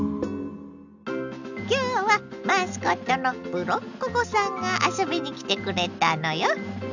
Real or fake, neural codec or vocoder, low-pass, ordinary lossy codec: real; none; 7.2 kHz; none